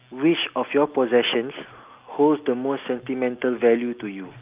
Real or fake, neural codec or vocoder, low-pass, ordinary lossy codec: real; none; 3.6 kHz; Opus, 24 kbps